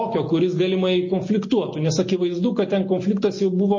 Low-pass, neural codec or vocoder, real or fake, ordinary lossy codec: 7.2 kHz; none; real; MP3, 32 kbps